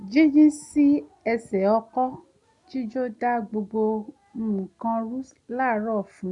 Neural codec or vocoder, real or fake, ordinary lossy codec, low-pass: none; real; none; 10.8 kHz